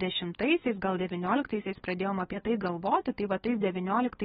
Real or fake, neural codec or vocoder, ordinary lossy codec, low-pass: fake; codec, 16 kHz, 8 kbps, FunCodec, trained on LibriTTS, 25 frames a second; AAC, 16 kbps; 7.2 kHz